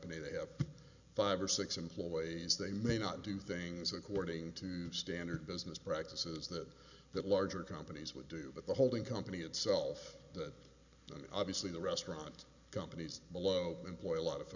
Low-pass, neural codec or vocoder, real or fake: 7.2 kHz; none; real